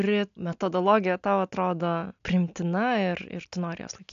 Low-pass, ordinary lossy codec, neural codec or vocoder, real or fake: 7.2 kHz; AAC, 64 kbps; none; real